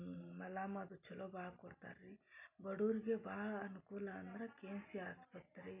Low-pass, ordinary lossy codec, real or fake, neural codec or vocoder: 3.6 kHz; none; real; none